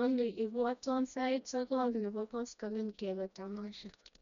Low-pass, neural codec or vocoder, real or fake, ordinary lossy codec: 7.2 kHz; codec, 16 kHz, 1 kbps, FreqCodec, smaller model; fake; none